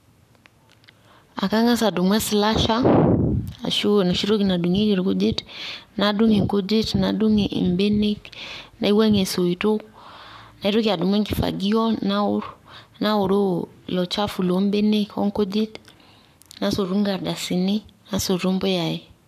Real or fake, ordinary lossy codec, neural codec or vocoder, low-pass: fake; none; codec, 44.1 kHz, 7.8 kbps, Pupu-Codec; 14.4 kHz